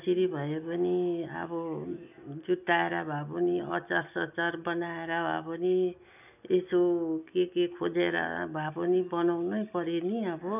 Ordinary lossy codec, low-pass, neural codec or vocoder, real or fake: none; 3.6 kHz; none; real